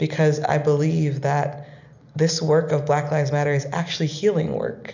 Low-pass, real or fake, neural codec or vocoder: 7.2 kHz; real; none